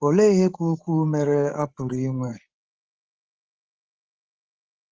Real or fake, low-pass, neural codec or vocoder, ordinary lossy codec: fake; 7.2 kHz; codec, 16 kHz, 16 kbps, FreqCodec, larger model; Opus, 16 kbps